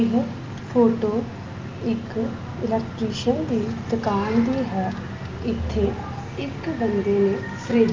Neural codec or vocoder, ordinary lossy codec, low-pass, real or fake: none; Opus, 32 kbps; 7.2 kHz; real